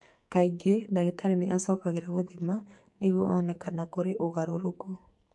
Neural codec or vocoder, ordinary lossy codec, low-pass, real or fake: codec, 44.1 kHz, 2.6 kbps, SNAC; MP3, 64 kbps; 10.8 kHz; fake